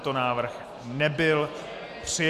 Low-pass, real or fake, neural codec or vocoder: 14.4 kHz; real; none